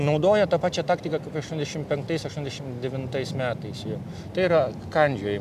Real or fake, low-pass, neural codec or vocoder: real; 14.4 kHz; none